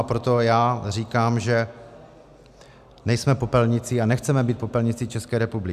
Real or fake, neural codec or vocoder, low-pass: real; none; 14.4 kHz